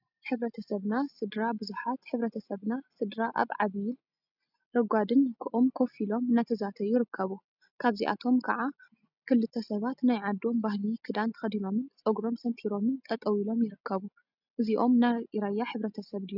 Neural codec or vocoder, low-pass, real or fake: none; 5.4 kHz; real